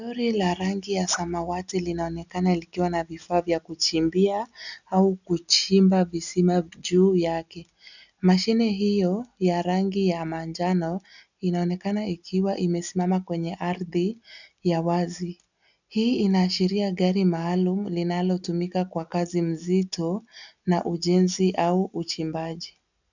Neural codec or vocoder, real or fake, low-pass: none; real; 7.2 kHz